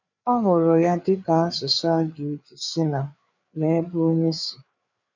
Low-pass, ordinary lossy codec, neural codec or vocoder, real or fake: 7.2 kHz; none; codec, 16 kHz, 4 kbps, FreqCodec, larger model; fake